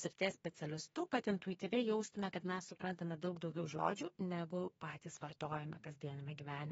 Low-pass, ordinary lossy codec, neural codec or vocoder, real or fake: 14.4 kHz; AAC, 24 kbps; codec, 32 kHz, 1.9 kbps, SNAC; fake